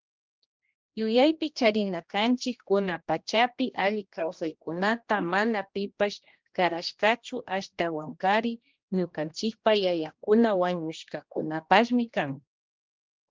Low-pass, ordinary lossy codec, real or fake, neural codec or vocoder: 7.2 kHz; Opus, 32 kbps; fake; codec, 16 kHz, 1 kbps, X-Codec, HuBERT features, trained on general audio